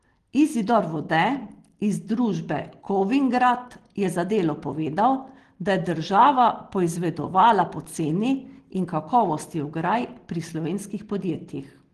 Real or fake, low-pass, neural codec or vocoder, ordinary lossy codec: real; 10.8 kHz; none; Opus, 16 kbps